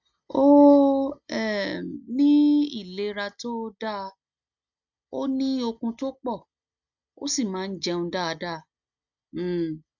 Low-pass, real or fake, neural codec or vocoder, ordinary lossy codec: 7.2 kHz; real; none; none